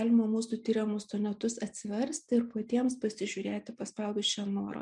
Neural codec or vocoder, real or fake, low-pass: none; real; 10.8 kHz